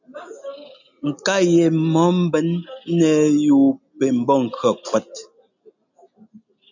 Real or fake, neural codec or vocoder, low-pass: real; none; 7.2 kHz